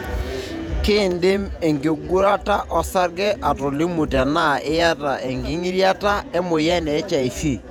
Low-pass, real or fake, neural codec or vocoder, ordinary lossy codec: 19.8 kHz; fake; vocoder, 48 kHz, 128 mel bands, Vocos; none